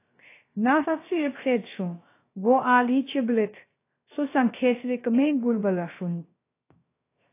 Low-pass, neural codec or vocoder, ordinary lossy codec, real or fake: 3.6 kHz; codec, 16 kHz, 0.3 kbps, FocalCodec; AAC, 24 kbps; fake